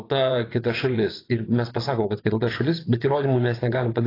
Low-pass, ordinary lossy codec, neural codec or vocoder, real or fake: 5.4 kHz; AAC, 24 kbps; vocoder, 24 kHz, 100 mel bands, Vocos; fake